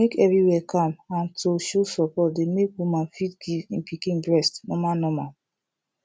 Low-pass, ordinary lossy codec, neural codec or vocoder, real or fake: none; none; none; real